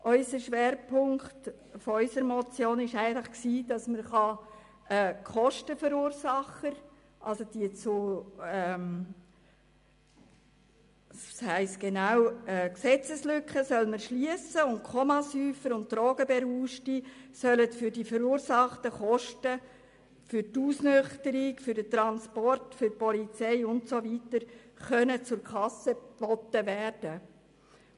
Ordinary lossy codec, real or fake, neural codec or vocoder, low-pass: MP3, 64 kbps; real; none; 10.8 kHz